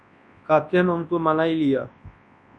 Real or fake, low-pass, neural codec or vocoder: fake; 9.9 kHz; codec, 24 kHz, 0.9 kbps, WavTokenizer, large speech release